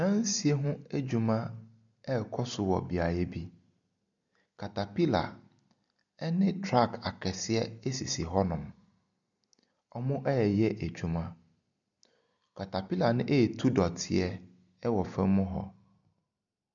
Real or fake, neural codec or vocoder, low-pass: real; none; 7.2 kHz